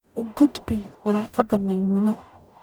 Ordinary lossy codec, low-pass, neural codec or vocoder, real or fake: none; none; codec, 44.1 kHz, 0.9 kbps, DAC; fake